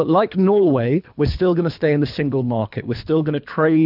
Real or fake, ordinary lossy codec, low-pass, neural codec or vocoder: fake; AAC, 48 kbps; 5.4 kHz; codec, 24 kHz, 3 kbps, HILCodec